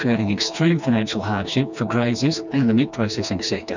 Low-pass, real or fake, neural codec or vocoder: 7.2 kHz; fake; codec, 16 kHz, 2 kbps, FreqCodec, smaller model